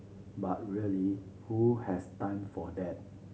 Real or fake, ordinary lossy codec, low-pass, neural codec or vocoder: real; none; none; none